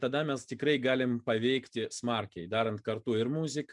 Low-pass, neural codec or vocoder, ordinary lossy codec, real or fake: 10.8 kHz; none; MP3, 96 kbps; real